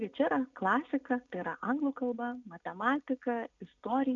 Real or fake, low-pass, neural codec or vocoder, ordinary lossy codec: real; 7.2 kHz; none; MP3, 64 kbps